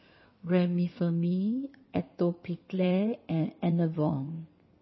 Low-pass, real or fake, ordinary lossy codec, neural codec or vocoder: 7.2 kHz; fake; MP3, 24 kbps; codec, 16 kHz in and 24 kHz out, 2.2 kbps, FireRedTTS-2 codec